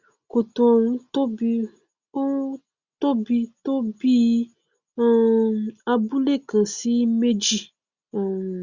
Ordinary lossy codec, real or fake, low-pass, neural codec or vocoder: Opus, 64 kbps; real; 7.2 kHz; none